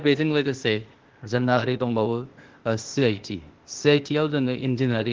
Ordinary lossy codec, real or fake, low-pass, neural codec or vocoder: Opus, 16 kbps; fake; 7.2 kHz; codec, 16 kHz, 0.8 kbps, ZipCodec